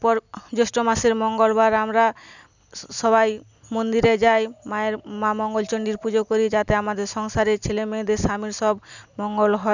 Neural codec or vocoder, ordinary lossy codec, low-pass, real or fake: none; none; 7.2 kHz; real